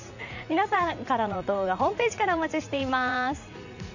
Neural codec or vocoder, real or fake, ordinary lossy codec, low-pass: vocoder, 44.1 kHz, 80 mel bands, Vocos; fake; none; 7.2 kHz